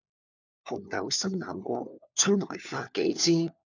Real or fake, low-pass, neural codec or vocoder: fake; 7.2 kHz; codec, 16 kHz, 4 kbps, FunCodec, trained on LibriTTS, 50 frames a second